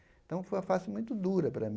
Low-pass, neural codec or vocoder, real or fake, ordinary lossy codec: none; none; real; none